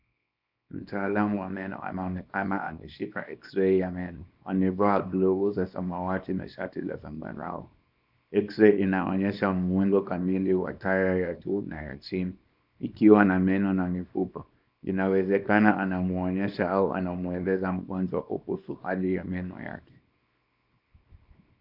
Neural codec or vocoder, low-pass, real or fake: codec, 24 kHz, 0.9 kbps, WavTokenizer, small release; 5.4 kHz; fake